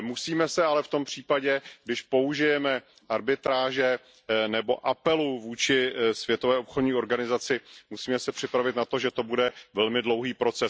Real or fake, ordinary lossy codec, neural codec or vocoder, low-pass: real; none; none; none